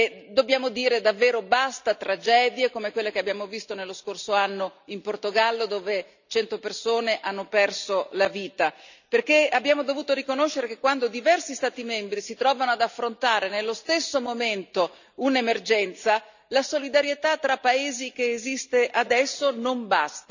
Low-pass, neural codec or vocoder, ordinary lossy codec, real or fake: 7.2 kHz; none; none; real